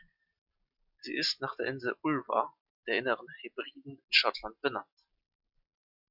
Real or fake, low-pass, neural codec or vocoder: real; 5.4 kHz; none